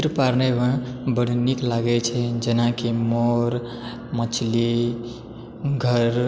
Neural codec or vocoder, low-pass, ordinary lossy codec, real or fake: none; none; none; real